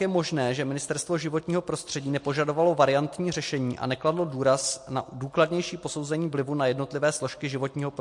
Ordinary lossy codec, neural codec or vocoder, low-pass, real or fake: MP3, 48 kbps; none; 10.8 kHz; real